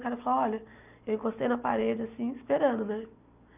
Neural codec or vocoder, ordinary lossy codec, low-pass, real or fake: none; none; 3.6 kHz; real